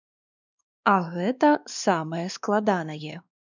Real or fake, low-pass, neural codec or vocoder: fake; 7.2 kHz; codec, 16 kHz, 2 kbps, X-Codec, WavLM features, trained on Multilingual LibriSpeech